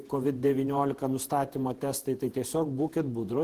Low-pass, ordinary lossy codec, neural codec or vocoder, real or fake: 14.4 kHz; Opus, 16 kbps; vocoder, 48 kHz, 128 mel bands, Vocos; fake